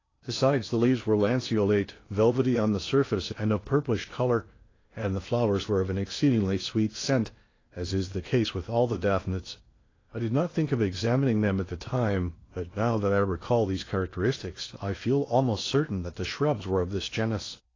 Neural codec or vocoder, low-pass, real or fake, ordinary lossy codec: codec, 16 kHz in and 24 kHz out, 0.8 kbps, FocalCodec, streaming, 65536 codes; 7.2 kHz; fake; AAC, 32 kbps